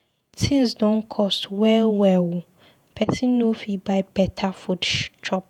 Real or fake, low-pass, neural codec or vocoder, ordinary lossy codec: fake; 19.8 kHz; vocoder, 48 kHz, 128 mel bands, Vocos; none